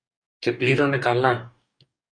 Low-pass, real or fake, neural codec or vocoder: 9.9 kHz; fake; codec, 44.1 kHz, 2.6 kbps, DAC